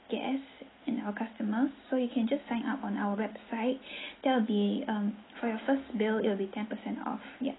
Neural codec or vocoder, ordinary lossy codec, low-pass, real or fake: none; AAC, 16 kbps; 7.2 kHz; real